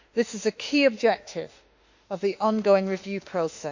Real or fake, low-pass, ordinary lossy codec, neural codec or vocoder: fake; 7.2 kHz; Opus, 64 kbps; autoencoder, 48 kHz, 32 numbers a frame, DAC-VAE, trained on Japanese speech